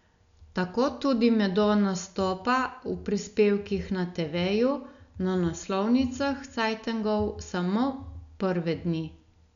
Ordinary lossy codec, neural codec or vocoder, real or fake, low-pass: none; none; real; 7.2 kHz